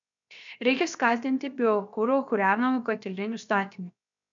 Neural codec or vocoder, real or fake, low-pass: codec, 16 kHz, 0.7 kbps, FocalCodec; fake; 7.2 kHz